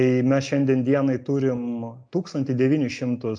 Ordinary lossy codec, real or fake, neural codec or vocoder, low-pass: MP3, 64 kbps; real; none; 9.9 kHz